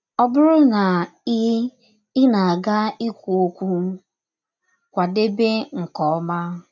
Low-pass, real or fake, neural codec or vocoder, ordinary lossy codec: 7.2 kHz; real; none; none